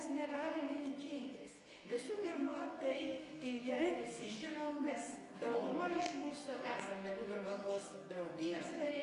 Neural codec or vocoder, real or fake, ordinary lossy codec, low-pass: codec, 24 kHz, 0.9 kbps, WavTokenizer, medium music audio release; fake; AAC, 48 kbps; 10.8 kHz